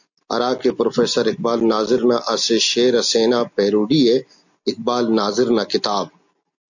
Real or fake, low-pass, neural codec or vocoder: real; 7.2 kHz; none